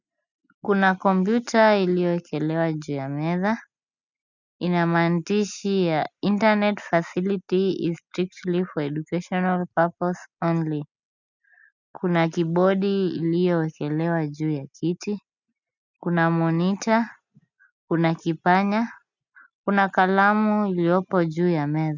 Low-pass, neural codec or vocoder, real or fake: 7.2 kHz; none; real